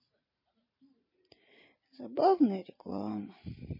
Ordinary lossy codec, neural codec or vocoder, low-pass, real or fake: MP3, 24 kbps; vocoder, 44.1 kHz, 128 mel bands every 512 samples, BigVGAN v2; 5.4 kHz; fake